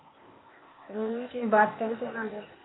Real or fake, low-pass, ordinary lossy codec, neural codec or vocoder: fake; 7.2 kHz; AAC, 16 kbps; codec, 16 kHz, 0.8 kbps, ZipCodec